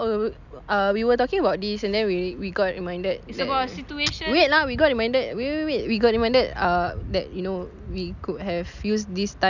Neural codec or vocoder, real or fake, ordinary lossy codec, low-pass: none; real; none; 7.2 kHz